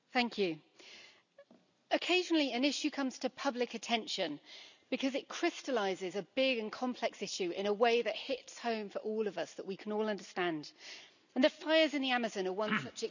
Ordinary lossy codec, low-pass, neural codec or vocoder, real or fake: none; 7.2 kHz; none; real